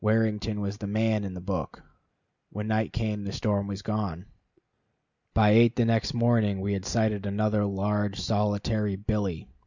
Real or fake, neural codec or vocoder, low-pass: real; none; 7.2 kHz